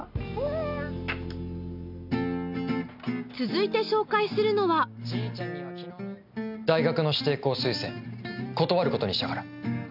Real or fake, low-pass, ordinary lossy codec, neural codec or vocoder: real; 5.4 kHz; none; none